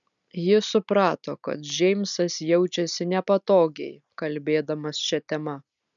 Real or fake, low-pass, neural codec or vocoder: real; 7.2 kHz; none